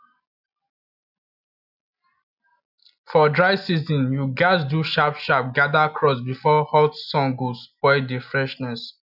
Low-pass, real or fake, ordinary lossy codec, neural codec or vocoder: 5.4 kHz; real; none; none